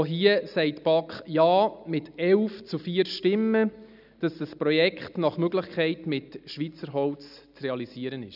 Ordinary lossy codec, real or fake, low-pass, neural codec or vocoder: none; real; 5.4 kHz; none